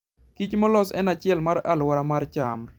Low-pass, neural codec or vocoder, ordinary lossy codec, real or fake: 19.8 kHz; vocoder, 44.1 kHz, 128 mel bands every 256 samples, BigVGAN v2; Opus, 32 kbps; fake